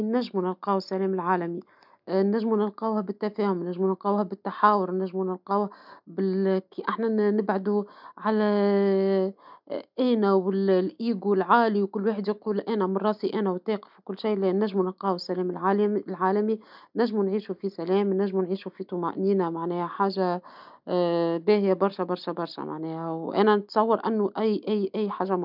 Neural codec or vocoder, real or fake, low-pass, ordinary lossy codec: none; real; 5.4 kHz; none